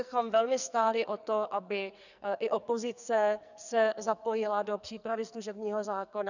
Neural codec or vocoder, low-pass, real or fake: codec, 44.1 kHz, 2.6 kbps, SNAC; 7.2 kHz; fake